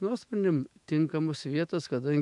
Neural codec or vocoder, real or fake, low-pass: autoencoder, 48 kHz, 128 numbers a frame, DAC-VAE, trained on Japanese speech; fake; 10.8 kHz